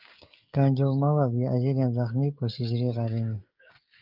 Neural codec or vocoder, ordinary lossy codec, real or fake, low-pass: codec, 16 kHz, 16 kbps, FreqCodec, smaller model; Opus, 32 kbps; fake; 5.4 kHz